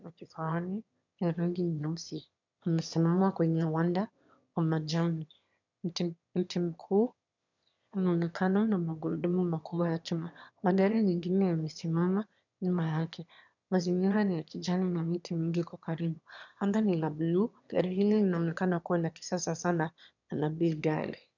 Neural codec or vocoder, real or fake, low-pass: autoencoder, 22.05 kHz, a latent of 192 numbers a frame, VITS, trained on one speaker; fake; 7.2 kHz